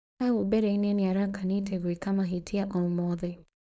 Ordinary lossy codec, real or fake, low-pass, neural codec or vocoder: none; fake; none; codec, 16 kHz, 4.8 kbps, FACodec